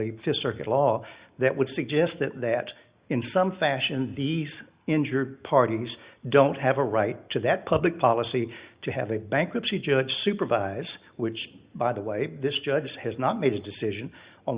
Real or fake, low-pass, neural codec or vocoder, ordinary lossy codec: real; 3.6 kHz; none; Opus, 64 kbps